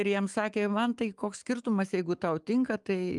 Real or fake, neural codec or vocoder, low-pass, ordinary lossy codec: fake; autoencoder, 48 kHz, 128 numbers a frame, DAC-VAE, trained on Japanese speech; 10.8 kHz; Opus, 32 kbps